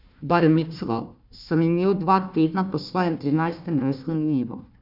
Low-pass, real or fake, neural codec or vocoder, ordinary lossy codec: 5.4 kHz; fake; codec, 16 kHz, 1 kbps, FunCodec, trained on Chinese and English, 50 frames a second; none